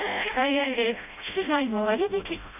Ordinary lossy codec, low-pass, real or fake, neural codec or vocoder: none; 3.6 kHz; fake; codec, 16 kHz, 0.5 kbps, FreqCodec, smaller model